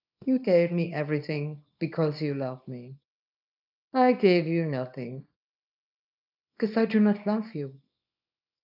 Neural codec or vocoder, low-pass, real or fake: codec, 24 kHz, 0.9 kbps, WavTokenizer, small release; 5.4 kHz; fake